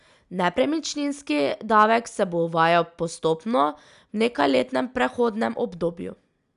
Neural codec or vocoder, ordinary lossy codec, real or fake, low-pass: none; none; real; 10.8 kHz